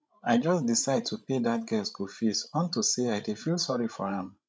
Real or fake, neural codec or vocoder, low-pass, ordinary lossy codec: fake; codec, 16 kHz, 16 kbps, FreqCodec, larger model; none; none